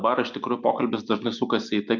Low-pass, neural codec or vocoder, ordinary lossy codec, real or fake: 7.2 kHz; none; MP3, 64 kbps; real